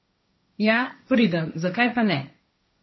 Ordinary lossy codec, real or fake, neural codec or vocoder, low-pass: MP3, 24 kbps; fake; codec, 16 kHz, 1.1 kbps, Voila-Tokenizer; 7.2 kHz